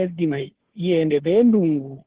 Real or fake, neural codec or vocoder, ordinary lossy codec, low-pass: fake; codec, 16 kHz, 2 kbps, FunCodec, trained on Chinese and English, 25 frames a second; Opus, 16 kbps; 3.6 kHz